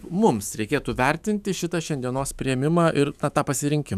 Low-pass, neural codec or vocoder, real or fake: 14.4 kHz; autoencoder, 48 kHz, 128 numbers a frame, DAC-VAE, trained on Japanese speech; fake